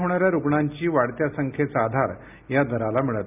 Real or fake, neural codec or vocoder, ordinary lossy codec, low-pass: real; none; none; 3.6 kHz